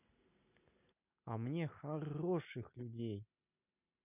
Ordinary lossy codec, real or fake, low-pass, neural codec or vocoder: none; real; 3.6 kHz; none